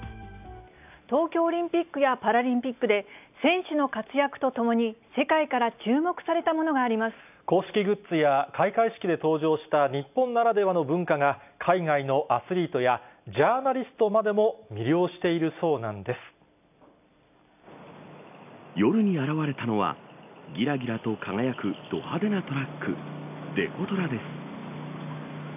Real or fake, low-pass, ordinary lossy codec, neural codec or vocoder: real; 3.6 kHz; none; none